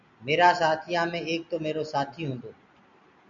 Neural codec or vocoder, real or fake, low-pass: none; real; 7.2 kHz